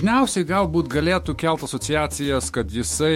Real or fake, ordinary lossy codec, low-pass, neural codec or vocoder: fake; MP3, 64 kbps; 19.8 kHz; autoencoder, 48 kHz, 128 numbers a frame, DAC-VAE, trained on Japanese speech